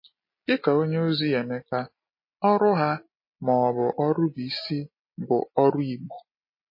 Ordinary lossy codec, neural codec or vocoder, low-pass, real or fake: MP3, 24 kbps; none; 5.4 kHz; real